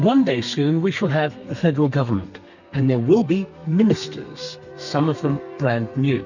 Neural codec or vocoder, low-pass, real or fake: codec, 32 kHz, 1.9 kbps, SNAC; 7.2 kHz; fake